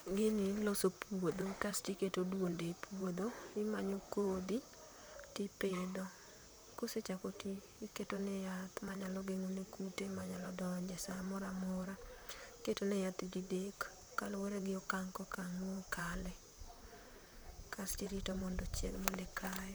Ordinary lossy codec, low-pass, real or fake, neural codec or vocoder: none; none; fake; vocoder, 44.1 kHz, 128 mel bands, Pupu-Vocoder